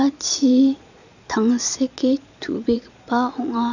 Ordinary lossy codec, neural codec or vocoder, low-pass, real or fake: none; vocoder, 22.05 kHz, 80 mel bands, Vocos; 7.2 kHz; fake